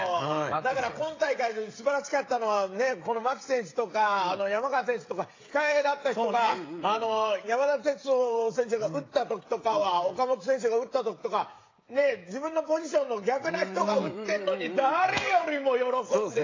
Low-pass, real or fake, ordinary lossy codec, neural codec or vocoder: 7.2 kHz; fake; AAC, 32 kbps; codec, 16 kHz, 16 kbps, FreqCodec, smaller model